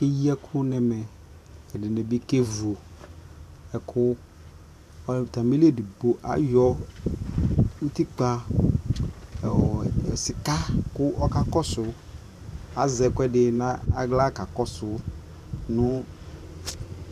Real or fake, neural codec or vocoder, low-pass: fake; vocoder, 44.1 kHz, 128 mel bands every 512 samples, BigVGAN v2; 14.4 kHz